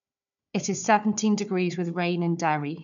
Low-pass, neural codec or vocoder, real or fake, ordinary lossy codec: 7.2 kHz; codec, 16 kHz, 4 kbps, FunCodec, trained on Chinese and English, 50 frames a second; fake; none